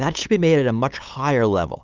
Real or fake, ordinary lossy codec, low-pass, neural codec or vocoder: fake; Opus, 32 kbps; 7.2 kHz; codec, 16 kHz, 8 kbps, FunCodec, trained on LibriTTS, 25 frames a second